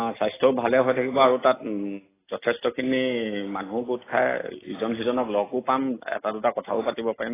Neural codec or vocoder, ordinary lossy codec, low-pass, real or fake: none; AAC, 16 kbps; 3.6 kHz; real